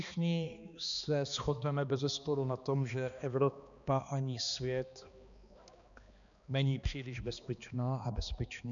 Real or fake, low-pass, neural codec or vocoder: fake; 7.2 kHz; codec, 16 kHz, 2 kbps, X-Codec, HuBERT features, trained on balanced general audio